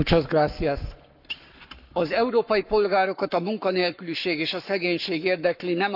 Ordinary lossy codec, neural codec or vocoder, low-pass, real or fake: none; codec, 44.1 kHz, 7.8 kbps, Pupu-Codec; 5.4 kHz; fake